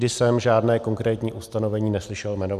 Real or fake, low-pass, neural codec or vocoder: real; 14.4 kHz; none